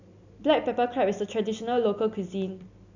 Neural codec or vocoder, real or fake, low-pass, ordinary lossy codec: none; real; 7.2 kHz; none